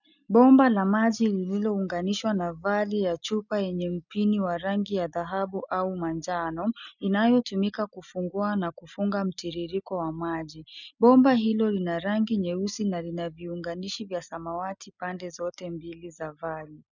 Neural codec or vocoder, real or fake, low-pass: none; real; 7.2 kHz